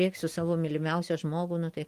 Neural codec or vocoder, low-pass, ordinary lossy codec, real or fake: none; 14.4 kHz; Opus, 24 kbps; real